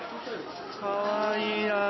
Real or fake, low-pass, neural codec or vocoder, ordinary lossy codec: real; 7.2 kHz; none; MP3, 24 kbps